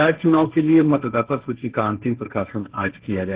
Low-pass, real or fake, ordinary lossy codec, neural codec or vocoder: 3.6 kHz; fake; Opus, 16 kbps; codec, 16 kHz, 1.1 kbps, Voila-Tokenizer